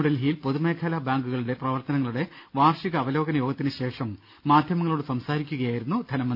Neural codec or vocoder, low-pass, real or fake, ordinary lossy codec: none; 5.4 kHz; real; none